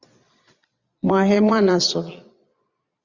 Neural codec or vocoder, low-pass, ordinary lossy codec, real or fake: vocoder, 22.05 kHz, 80 mel bands, Vocos; 7.2 kHz; Opus, 64 kbps; fake